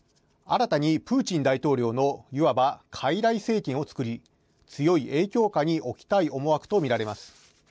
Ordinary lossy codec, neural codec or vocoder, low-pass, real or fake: none; none; none; real